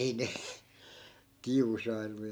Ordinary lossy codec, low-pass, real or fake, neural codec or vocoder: none; none; real; none